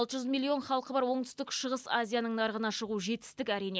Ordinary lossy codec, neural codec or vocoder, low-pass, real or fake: none; none; none; real